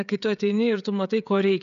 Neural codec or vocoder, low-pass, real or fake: codec, 16 kHz, 16 kbps, FreqCodec, smaller model; 7.2 kHz; fake